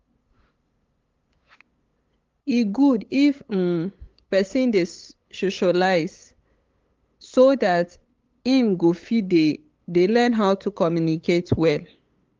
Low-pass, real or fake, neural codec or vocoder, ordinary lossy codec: 7.2 kHz; fake; codec, 16 kHz, 8 kbps, FunCodec, trained on LibriTTS, 25 frames a second; Opus, 32 kbps